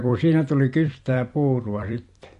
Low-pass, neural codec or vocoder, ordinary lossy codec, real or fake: 14.4 kHz; vocoder, 44.1 kHz, 128 mel bands every 256 samples, BigVGAN v2; MP3, 48 kbps; fake